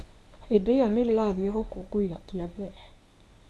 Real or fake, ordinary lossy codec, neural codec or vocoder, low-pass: fake; none; codec, 24 kHz, 0.9 kbps, WavTokenizer, medium speech release version 1; none